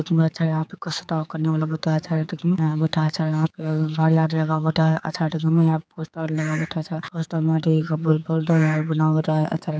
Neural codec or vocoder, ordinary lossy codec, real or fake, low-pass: codec, 16 kHz, 4 kbps, X-Codec, HuBERT features, trained on general audio; none; fake; none